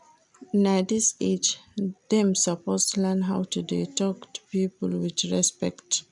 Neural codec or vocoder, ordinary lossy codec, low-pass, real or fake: none; none; 10.8 kHz; real